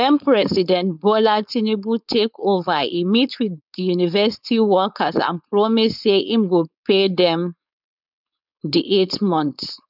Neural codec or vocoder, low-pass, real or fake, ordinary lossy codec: codec, 16 kHz, 4.8 kbps, FACodec; 5.4 kHz; fake; none